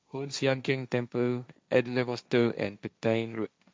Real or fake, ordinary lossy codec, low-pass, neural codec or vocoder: fake; none; none; codec, 16 kHz, 1.1 kbps, Voila-Tokenizer